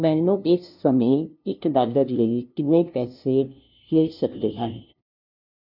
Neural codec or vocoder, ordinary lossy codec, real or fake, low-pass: codec, 16 kHz, 0.5 kbps, FunCodec, trained on LibriTTS, 25 frames a second; none; fake; 5.4 kHz